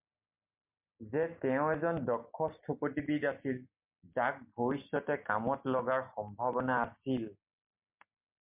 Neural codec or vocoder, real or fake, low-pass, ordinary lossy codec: none; real; 3.6 kHz; MP3, 32 kbps